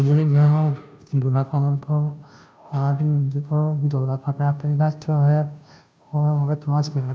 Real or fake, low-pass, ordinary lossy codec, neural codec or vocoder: fake; none; none; codec, 16 kHz, 0.5 kbps, FunCodec, trained on Chinese and English, 25 frames a second